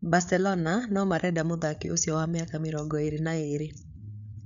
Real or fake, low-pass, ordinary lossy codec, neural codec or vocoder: fake; 7.2 kHz; none; codec, 16 kHz, 8 kbps, FreqCodec, larger model